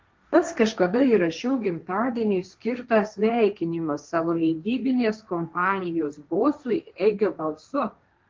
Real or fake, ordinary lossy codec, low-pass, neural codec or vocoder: fake; Opus, 32 kbps; 7.2 kHz; codec, 16 kHz, 1.1 kbps, Voila-Tokenizer